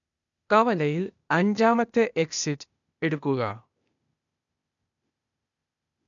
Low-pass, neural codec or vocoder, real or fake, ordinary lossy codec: 7.2 kHz; codec, 16 kHz, 0.8 kbps, ZipCodec; fake; none